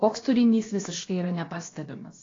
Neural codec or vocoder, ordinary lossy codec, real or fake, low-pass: codec, 16 kHz, about 1 kbps, DyCAST, with the encoder's durations; AAC, 32 kbps; fake; 7.2 kHz